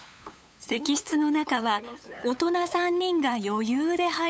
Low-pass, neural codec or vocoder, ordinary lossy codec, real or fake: none; codec, 16 kHz, 8 kbps, FunCodec, trained on LibriTTS, 25 frames a second; none; fake